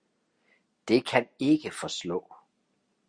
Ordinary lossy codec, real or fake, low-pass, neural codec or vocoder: Opus, 64 kbps; real; 9.9 kHz; none